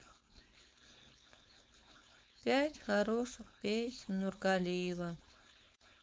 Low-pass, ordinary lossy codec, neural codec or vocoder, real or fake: none; none; codec, 16 kHz, 4.8 kbps, FACodec; fake